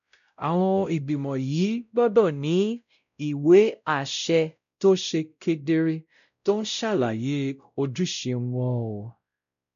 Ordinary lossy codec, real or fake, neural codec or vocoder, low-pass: none; fake; codec, 16 kHz, 0.5 kbps, X-Codec, WavLM features, trained on Multilingual LibriSpeech; 7.2 kHz